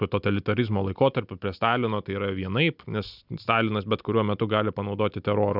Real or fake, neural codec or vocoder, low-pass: real; none; 5.4 kHz